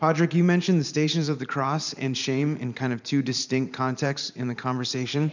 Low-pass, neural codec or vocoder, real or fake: 7.2 kHz; vocoder, 44.1 kHz, 128 mel bands every 512 samples, BigVGAN v2; fake